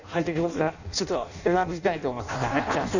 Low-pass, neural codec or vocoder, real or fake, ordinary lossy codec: 7.2 kHz; codec, 16 kHz in and 24 kHz out, 0.6 kbps, FireRedTTS-2 codec; fake; none